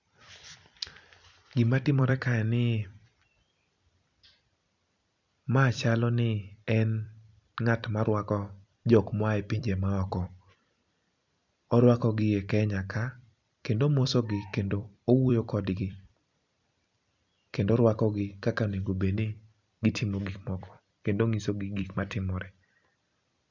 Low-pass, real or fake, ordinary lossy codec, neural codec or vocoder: 7.2 kHz; fake; none; vocoder, 44.1 kHz, 128 mel bands every 256 samples, BigVGAN v2